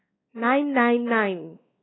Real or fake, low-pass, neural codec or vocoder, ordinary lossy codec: fake; 7.2 kHz; codec, 16 kHz, 4 kbps, X-Codec, WavLM features, trained on Multilingual LibriSpeech; AAC, 16 kbps